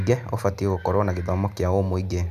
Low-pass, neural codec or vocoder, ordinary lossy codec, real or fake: 14.4 kHz; none; none; real